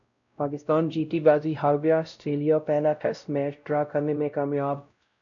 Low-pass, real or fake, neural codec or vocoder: 7.2 kHz; fake; codec, 16 kHz, 0.5 kbps, X-Codec, WavLM features, trained on Multilingual LibriSpeech